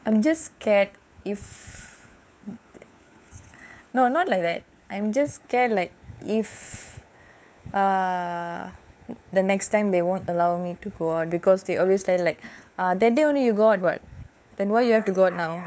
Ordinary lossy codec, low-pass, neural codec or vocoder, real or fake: none; none; codec, 16 kHz, 4 kbps, FunCodec, trained on LibriTTS, 50 frames a second; fake